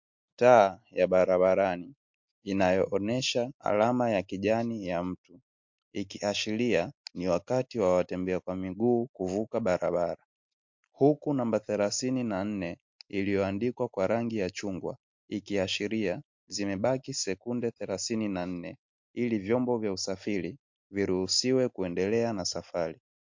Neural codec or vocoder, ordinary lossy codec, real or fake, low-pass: none; MP3, 48 kbps; real; 7.2 kHz